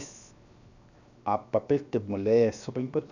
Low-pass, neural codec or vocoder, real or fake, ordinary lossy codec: 7.2 kHz; codec, 16 kHz, 0.7 kbps, FocalCodec; fake; none